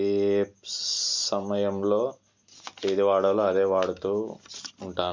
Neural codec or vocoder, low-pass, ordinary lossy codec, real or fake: none; 7.2 kHz; MP3, 64 kbps; real